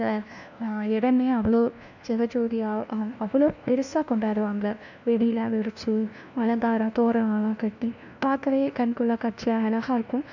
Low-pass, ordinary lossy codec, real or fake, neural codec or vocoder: 7.2 kHz; none; fake; codec, 16 kHz, 1 kbps, FunCodec, trained on LibriTTS, 50 frames a second